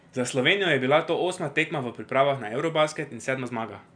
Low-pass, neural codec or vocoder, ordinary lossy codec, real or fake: 9.9 kHz; none; none; real